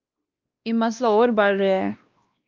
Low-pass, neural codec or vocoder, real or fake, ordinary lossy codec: 7.2 kHz; codec, 16 kHz, 1 kbps, X-Codec, WavLM features, trained on Multilingual LibriSpeech; fake; Opus, 24 kbps